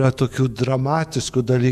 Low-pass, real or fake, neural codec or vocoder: 14.4 kHz; fake; autoencoder, 48 kHz, 128 numbers a frame, DAC-VAE, trained on Japanese speech